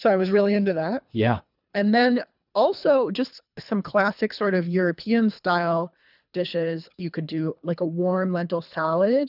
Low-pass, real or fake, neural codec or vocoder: 5.4 kHz; fake; codec, 24 kHz, 3 kbps, HILCodec